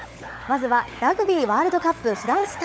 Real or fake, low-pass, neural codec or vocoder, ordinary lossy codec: fake; none; codec, 16 kHz, 16 kbps, FunCodec, trained on LibriTTS, 50 frames a second; none